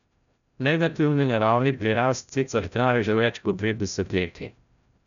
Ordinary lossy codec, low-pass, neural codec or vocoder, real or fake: none; 7.2 kHz; codec, 16 kHz, 0.5 kbps, FreqCodec, larger model; fake